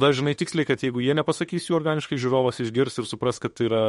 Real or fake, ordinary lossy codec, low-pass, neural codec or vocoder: fake; MP3, 48 kbps; 19.8 kHz; autoencoder, 48 kHz, 32 numbers a frame, DAC-VAE, trained on Japanese speech